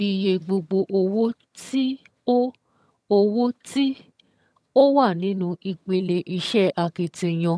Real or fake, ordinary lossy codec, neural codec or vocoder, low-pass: fake; none; vocoder, 22.05 kHz, 80 mel bands, HiFi-GAN; none